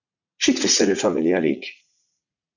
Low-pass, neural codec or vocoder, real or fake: 7.2 kHz; vocoder, 44.1 kHz, 80 mel bands, Vocos; fake